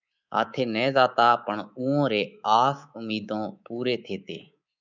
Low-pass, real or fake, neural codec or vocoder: 7.2 kHz; fake; codec, 24 kHz, 3.1 kbps, DualCodec